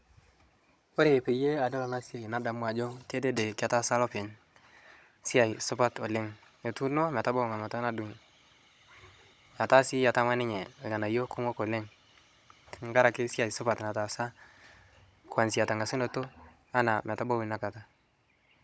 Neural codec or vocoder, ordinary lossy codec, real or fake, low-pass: codec, 16 kHz, 16 kbps, FunCodec, trained on Chinese and English, 50 frames a second; none; fake; none